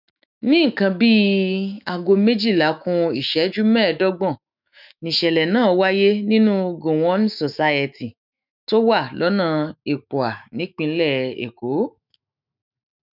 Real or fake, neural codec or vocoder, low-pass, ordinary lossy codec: fake; autoencoder, 48 kHz, 128 numbers a frame, DAC-VAE, trained on Japanese speech; 5.4 kHz; none